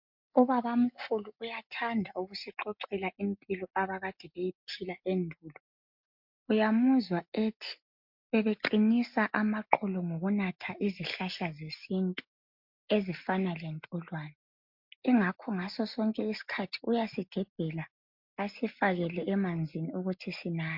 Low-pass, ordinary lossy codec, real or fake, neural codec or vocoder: 5.4 kHz; MP3, 48 kbps; real; none